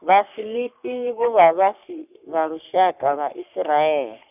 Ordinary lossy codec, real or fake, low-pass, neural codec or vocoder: none; fake; 3.6 kHz; codec, 44.1 kHz, 3.4 kbps, Pupu-Codec